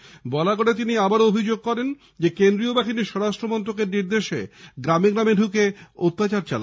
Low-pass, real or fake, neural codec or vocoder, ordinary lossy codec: none; real; none; none